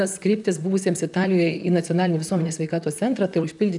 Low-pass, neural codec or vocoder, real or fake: 10.8 kHz; vocoder, 44.1 kHz, 128 mel bands, Pupu-Vocoder; fake